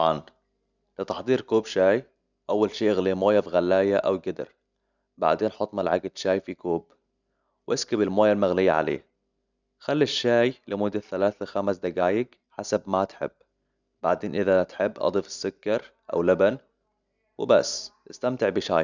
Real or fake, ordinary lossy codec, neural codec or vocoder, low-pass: real; none; none; 7.2 kHz